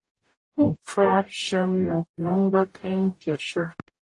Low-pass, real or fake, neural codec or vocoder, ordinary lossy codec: 10.8 kHz; fake; codec, 44.1 kHz, 0.9 kbps, DAC; AAC, 48 kbps